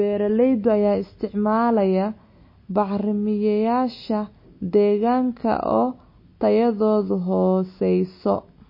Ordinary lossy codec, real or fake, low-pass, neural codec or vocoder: MP3, 24 kbps; real; 5.4 kHz; none